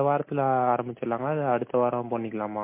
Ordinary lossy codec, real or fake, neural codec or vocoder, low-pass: MP3, 24 kbps; real; none; 3.6 kHz